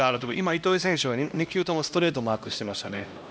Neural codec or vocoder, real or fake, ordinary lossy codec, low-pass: codec, 16 kHz, 1 kbps, X-Codec, HuBERT features, trained on LibriSpeech; fake; none; none